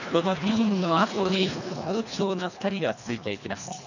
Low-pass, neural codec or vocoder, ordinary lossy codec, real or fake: 7.2 kHz; codec, 24 kHz, 1.5 kbps, HILCodec; none; fake